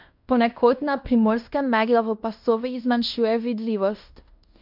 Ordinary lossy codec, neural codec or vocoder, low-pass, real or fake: MP3, 48 kbps; codec, 16 kHz in and 24 kHz out, 0.9 kbps, LongCat-Audio-Codec, fine tuned four codebook decoder; 5.4 kHz; fake